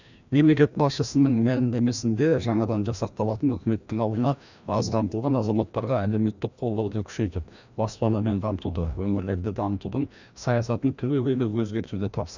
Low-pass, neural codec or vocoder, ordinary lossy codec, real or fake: 7.2 kHz; codec, 16 kHz, 1 kbps, FreqCodec, larger model; none; fake